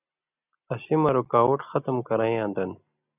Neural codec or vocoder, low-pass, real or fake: none; 3.6 kHz; real